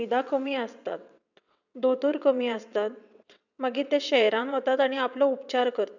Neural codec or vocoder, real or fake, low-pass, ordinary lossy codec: vocoder, 22.05 kHz, 80 mel bands, WaveNeXt; fake; 7.2 kHz; none